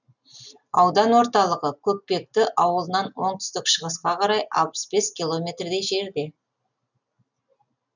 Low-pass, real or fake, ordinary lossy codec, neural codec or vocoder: 7.2 kHz; real; none; none